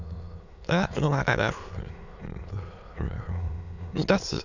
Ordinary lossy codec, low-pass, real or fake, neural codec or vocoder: none; 7.2 kHz; fake; autoencoder, 22.05 kHz, a latent of 192 numbers a frame, VITS, trained on many speakers